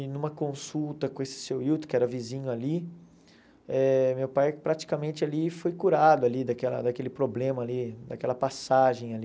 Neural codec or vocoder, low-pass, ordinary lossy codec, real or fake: none; none; none; real